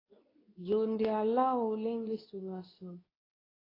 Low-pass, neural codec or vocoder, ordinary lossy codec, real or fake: 5.4 kHz; codec, 24 kHz, 0.9 kbps, WavTokenizer, medium speech release version 2; AAC, 24 kbps; fake